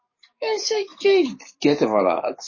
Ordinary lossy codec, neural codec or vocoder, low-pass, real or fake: MP3, 32 kbps; codec, 44.1 kHz, 7.8 kbps, DAC; 7.2 kHz; fake